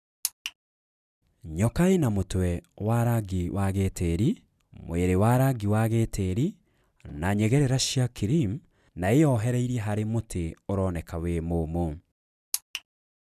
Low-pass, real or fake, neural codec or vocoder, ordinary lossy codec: 14.4 kHz; real; none; none